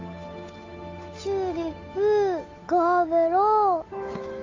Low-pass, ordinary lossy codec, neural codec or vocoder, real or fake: 7.2 kHz; AAC, 32 kbps; codec, 16 kHz, 8 kbps, FunCodec, trained on Chinese and English, 25 frames a second; fake